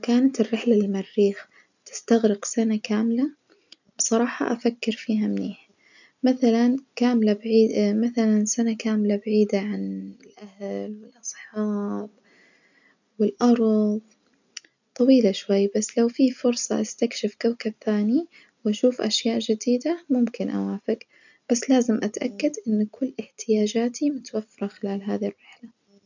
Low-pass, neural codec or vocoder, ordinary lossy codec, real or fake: 7.2 kHz; none; none; real